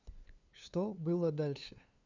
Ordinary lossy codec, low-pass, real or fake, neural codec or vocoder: Opus, 64 kbps; 7.2 kHz; fake; codec, 16 kHz, 2 kbps, FunCodec, trained on LibriTTS, 25 frames a second